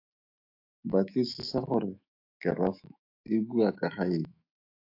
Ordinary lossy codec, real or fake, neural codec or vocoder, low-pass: AAC, 32 kbps; fake; autoencoder, 48 kHz, 128 numbers a frame, DAC-VAE, trained on Japanese speech; 5.4 kHz